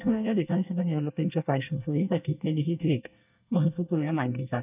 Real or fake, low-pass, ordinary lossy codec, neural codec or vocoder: fake; 3.6 kHz; none; codec, 24 kHz, 1 kbps, SNAC